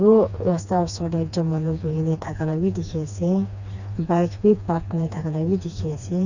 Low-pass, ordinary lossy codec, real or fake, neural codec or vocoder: 7.2 kHz; none; fake; codec, 16 kHz, 2 kbps, FreqCodec, smaller model